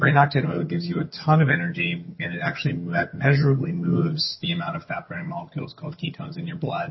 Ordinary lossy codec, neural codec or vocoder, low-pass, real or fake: MP3, 24 kbps; vocoder, 22.05 kHz, 80 mel bands, HiFi-GAN; 7.2 kHz; fake